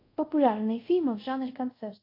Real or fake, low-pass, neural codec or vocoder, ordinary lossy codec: fake; 5.4 kHz; codec, 24 kHz, 0.5 kbps, DualCodec; AAC, 32 kbps